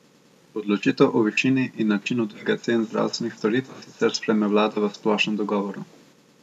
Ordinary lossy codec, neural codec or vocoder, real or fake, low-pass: none; none; real; 14.4 kHz